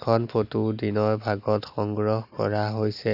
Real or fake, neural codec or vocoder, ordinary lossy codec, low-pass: real; none; none; 5.4 kHz